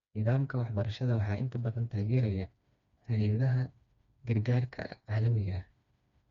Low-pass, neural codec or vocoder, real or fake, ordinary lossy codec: 7.2 kHz; codec, 16 kHz, 2 kbps, FreqCodec, smaller model; fake; Opus, 64 kbps